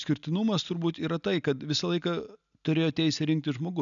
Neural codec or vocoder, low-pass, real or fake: none; 7.2 kHz; real